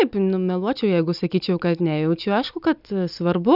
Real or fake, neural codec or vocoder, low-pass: real; none; 5.4 kHz